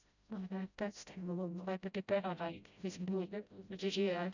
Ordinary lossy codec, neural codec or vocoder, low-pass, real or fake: none; codec, 16 kHz, 0.5 kbps, FreqCodec, smaller model; 7.2 kHz; fake